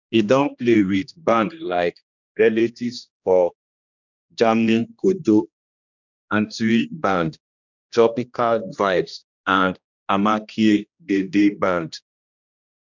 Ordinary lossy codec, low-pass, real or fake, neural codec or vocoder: none; 7.2 kHz; fake; codec, 16 kHz, 1 kbps, X-Codec, HuBERT features, trained on general audio